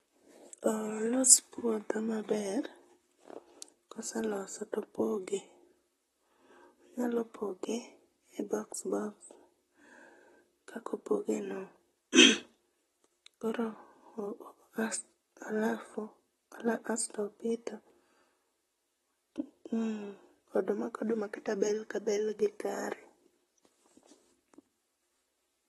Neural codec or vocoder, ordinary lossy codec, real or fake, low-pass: vocoder, 44.1 kHz, 128 mel bands, Pupu-Vocoder; AAC, 32 kbps; fake; 19.8 kHz